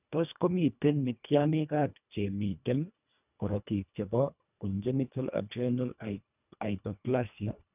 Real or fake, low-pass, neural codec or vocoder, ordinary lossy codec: fake; 3.6 kHz; codec, 24 kHz, 1.5 kbps, HILCodec; none